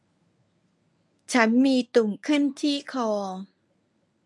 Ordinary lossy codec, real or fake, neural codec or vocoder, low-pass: none; fake; codec, 24 kHz, 0.9 kbps, WavTokenizer, medium speech release version 1; none